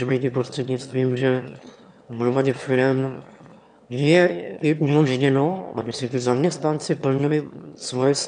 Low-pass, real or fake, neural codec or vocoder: 9.9 kHz; fake; autoencoder, 22.05 kHz, a latent of 192 numbers a frame, VITS, trained on one speaker